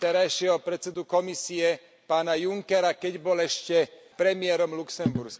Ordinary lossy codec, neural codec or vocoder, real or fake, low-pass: none; none; real; none